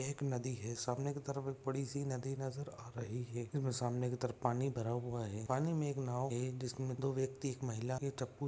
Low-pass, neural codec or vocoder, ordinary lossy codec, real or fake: none; none; none; real